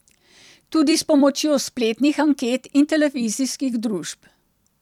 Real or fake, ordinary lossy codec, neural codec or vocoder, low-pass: fake; none; vocoder, 44.1 kHz, 128 mel bands every 256 samples, BigVGAN v2; 19.8 kHz